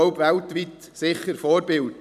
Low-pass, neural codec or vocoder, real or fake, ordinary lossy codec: 14.4 kHz; vocoder, 44.1 kHz, 128 mel bands every 256 samples, BigVGAN v2; fake; none